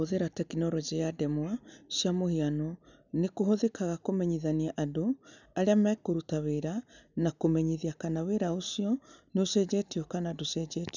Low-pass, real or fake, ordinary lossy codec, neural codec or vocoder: 7.2 kHz; real; none; none